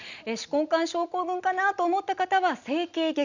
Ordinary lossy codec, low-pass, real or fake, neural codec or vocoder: none; 7.2 kHz; real; none